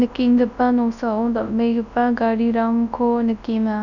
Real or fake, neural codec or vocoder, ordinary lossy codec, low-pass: fake; codec, 24 kHz, 0.9 kbps, WavTokenizer, large speech release; none; 7.2 kHz